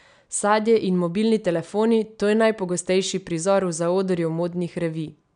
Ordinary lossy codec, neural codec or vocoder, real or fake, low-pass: none; none; real; 9.9 kHz